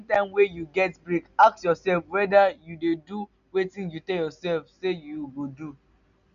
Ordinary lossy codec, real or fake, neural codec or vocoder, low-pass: none; real; none; 7.2 kHz